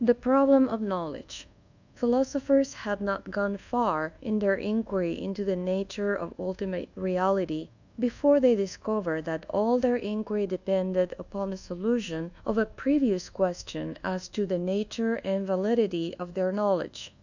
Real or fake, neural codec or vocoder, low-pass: fake; codec, 24 kHz, 1.2 kbps, DualCodec; 7.2 kHz